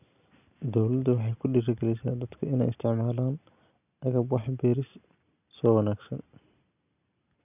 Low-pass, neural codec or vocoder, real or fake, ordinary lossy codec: 3.6 kHz; none; real; none